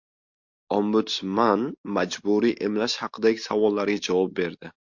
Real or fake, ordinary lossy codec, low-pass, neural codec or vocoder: real; MP3, 48 kbps; 7.2 kHz; none